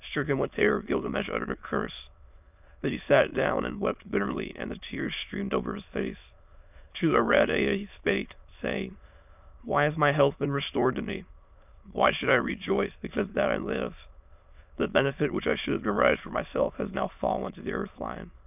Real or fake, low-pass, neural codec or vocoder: fake; 3.6 kHz; autoencoder, 22.05 kHz, a latent of 192 numbers a frame, VITS, trained on many speakers